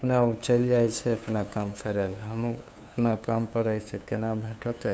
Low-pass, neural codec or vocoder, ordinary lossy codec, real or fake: none; codec, 16 kHz, 2 kbps, FunCodec, trained on LibriTTS, 25 frames a second; none; fake